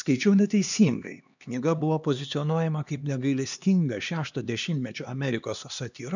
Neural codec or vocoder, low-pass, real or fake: codec, 16 kHz, 2 kbps, X-Codec, HuBERT features, trained on LibriSpeech; 7.2 kHz; fake